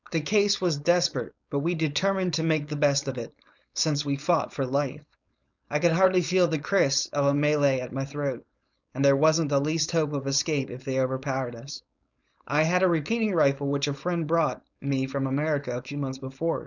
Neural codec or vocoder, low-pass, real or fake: codec, 16 kHz, 4.8 kbps, FACodec; 7.2 kHz; fake